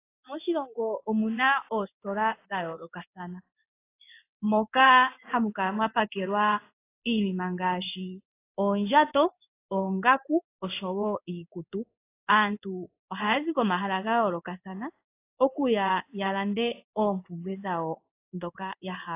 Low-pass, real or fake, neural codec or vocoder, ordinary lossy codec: 3.6 kHz; fake; codec, 16 kHz in and 24 kHz out, 1 kbps, XY-Tokenizer; AAC, 24 kbps